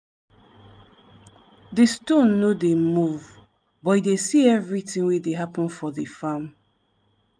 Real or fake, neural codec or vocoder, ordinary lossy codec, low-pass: real; none; none; 9.9 kHz